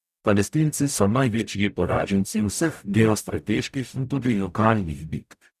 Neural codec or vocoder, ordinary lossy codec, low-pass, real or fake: codec, 44.1 kHz, 0.9 kbps, DAC; none; 14.4 kHz; fake